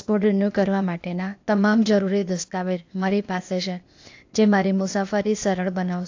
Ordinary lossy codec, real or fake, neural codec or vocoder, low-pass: AAC, 48 kbps; fake; codec, 16 kHz, 0.8 kbps, ZipCodec; 7.2 kHz